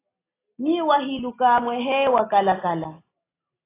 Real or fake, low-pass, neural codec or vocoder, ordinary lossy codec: real; 3.6 kHz; none; AAC, 16 kbps